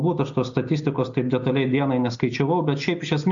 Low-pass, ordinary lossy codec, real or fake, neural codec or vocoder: 7.2 kHz; AAC, 64 kbps; real; none